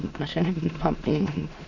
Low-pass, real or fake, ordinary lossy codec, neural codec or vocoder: 7.2 kHz; fake; none; autoencoder, 22.05 kHz, a latent of 192 numbers a frame, VITS, trained on many speakers